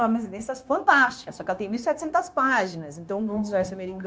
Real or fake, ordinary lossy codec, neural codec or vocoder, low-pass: fake; none; codec, 16 kHz, 0.9 kbps, LongCat-Audio-Codec; none